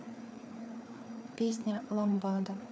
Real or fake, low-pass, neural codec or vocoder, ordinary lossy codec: fake; none; codec, 16 kHz, 4 kbps, FreqCodec, larger model; none